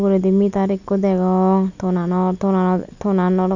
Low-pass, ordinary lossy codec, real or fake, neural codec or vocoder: 7.2 kHz; none; real; none